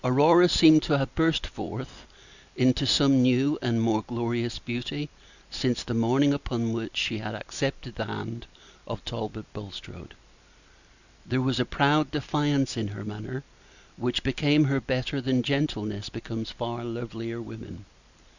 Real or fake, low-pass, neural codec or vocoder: real; 7.2 kHz; none